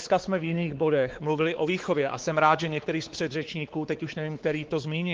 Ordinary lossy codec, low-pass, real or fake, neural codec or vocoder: Opus, 16 kbps; 7.2 kHz; fake; codec, 16 kHz, 4 kbps, X-Codec, WavLM features, trained on Multilingual LibriSpeech